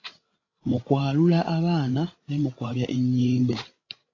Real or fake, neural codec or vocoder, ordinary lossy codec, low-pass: fake; codec, 16 kHz, 16 kbps, FreqCodec, larger model; AAC, 32 kbps; 7.2 kHz